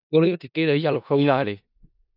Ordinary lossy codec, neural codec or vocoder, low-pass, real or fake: none; codec, 16 kHz in and 24 kHz out, 0.4 kbps, LongCat-Audio-Codec, four codebook decoder; 5.4 kHz; fake